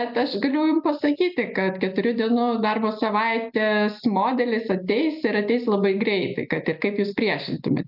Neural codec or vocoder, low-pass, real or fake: none; 5.4 kHz; real